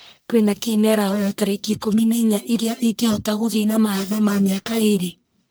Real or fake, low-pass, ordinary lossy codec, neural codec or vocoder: fake; none; none; codec, 44.1 kHz, 1.7 kbps, Pupu-Codec